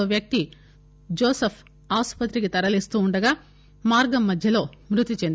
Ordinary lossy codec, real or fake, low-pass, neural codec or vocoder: none; real; none; none